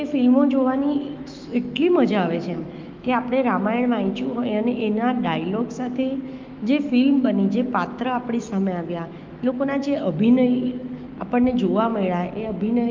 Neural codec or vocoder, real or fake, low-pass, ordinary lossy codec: autoencoder, 48 kHz, 128 numbers a frame, DAC-VAE, trained on Japanese speech; fake; 7.2 kHz; Opus, 32 kbps